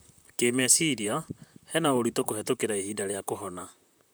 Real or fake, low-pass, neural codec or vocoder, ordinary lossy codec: fake; none; vocoder, 44.1 kHz, 128 mel bands, Pupu-Vocoder; none